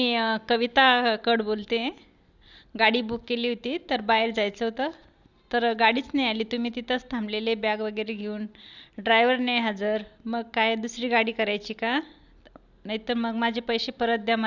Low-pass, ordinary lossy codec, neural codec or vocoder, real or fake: 7.2 kHz; Opus, 64 kbps; none; real